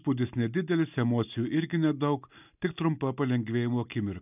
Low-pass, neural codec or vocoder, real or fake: 3.6 kHz; none; real